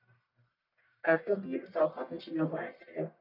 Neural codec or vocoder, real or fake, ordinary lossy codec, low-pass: codec, 44.1 kHz, 1.7 kbps, Pupu-Codec; fake; none; 5.4 kHz